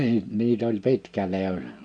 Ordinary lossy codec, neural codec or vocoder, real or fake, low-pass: none; none; real; 9.9 kHz